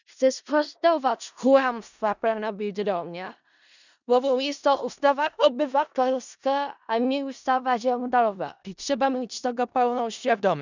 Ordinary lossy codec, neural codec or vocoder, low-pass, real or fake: none; codec, 16 kHz in and 24 kHz out, 0.4 kbps, LongCat-Audio-Codec, four codebook decoder; 7.2 kHz; fake